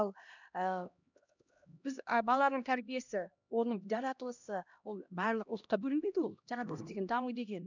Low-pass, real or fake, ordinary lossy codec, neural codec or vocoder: 7.2 kHz; fake; none; codec, 16 kHz, 1 kbps, X-Codec, HuBERT features, trained on LibriSpeech